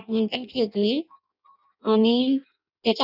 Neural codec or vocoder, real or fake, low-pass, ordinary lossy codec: codec, 16 kHz in and 24 kHz out, 0.6 kbps, FireRedTTS-2 codec; fake; 5.4 kHz; none